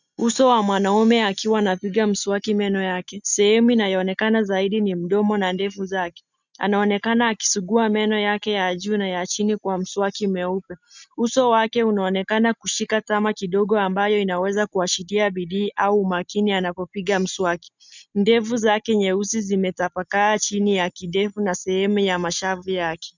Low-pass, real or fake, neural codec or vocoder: 7.2 kHz; real; none